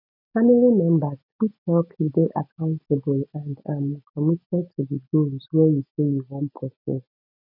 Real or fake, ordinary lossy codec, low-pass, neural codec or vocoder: real; none; 5.4 kHz; none